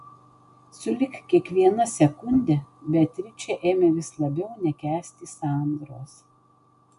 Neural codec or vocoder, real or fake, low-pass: none; real; 10.8 kHz